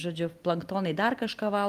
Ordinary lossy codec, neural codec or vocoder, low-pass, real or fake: Opus, 32 kbps; none; 14.4 kHz; real